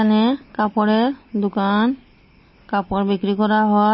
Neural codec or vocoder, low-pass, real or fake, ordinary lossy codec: none; 7.2 kHz; real; MP3, 24 kbps